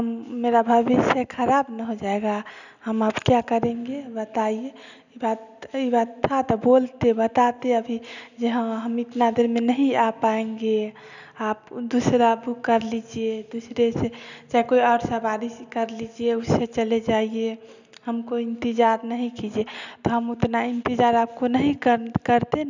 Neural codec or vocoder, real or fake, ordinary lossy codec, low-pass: none; real; none; 7.2 kHz